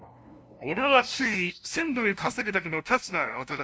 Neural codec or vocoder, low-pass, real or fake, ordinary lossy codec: codec, 16 kHz, 0.5 kbps, FunCodec, trained on LibriTTS, 25 frames a second; none; fake; none